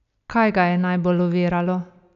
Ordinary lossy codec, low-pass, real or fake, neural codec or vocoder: none; 7.2 kHz; real; none